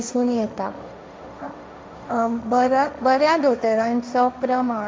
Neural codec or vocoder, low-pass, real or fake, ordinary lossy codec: codec, 16 kHz, 1.1 kbps, Voila-Tokenizer; none; fake; none